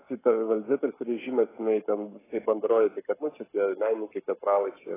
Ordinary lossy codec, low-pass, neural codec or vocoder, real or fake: AAC, 16 kbps; 3.6 kHz; codec, 44.1 kHz, 7.8 kbps, Pupu-Codec; fake